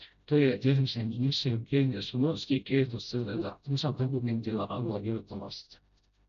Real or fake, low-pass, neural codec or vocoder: fake; 7.2 kHz; codec, 16 kHz, 0.5 kbps, FreqCodec, smaller model